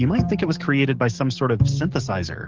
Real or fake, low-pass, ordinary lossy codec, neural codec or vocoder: fake; 7.2 kHz; Opus, 16 kbps; codec, 44.1 kHz, 7.8 kbps, DAC